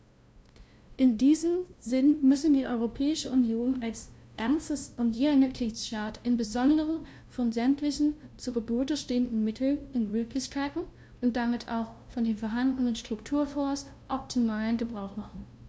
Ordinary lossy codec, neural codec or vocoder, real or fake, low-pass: none; codec, 16 kHz, 0.5 kbps, FunCodec, trained on LibriTTS, 25 frames a second; fake; none